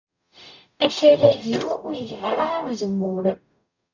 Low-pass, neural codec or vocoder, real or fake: 7.2 kHz; codec, 44.1 kHz, 0.9 kbps, DAC; fake